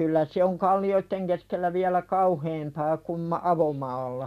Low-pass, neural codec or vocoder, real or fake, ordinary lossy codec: 14.4 kHz; none; real; none